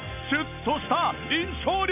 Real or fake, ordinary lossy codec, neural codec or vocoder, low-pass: real; none; none; 3.6 kHz